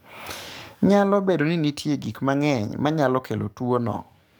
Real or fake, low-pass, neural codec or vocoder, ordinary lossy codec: fake; none; codec, 44.1 kHz, 7.8 kbps, DAC; none